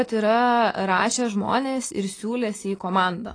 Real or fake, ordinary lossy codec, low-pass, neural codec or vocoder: real; AAC, 32 kbps; 9.9 kHz; none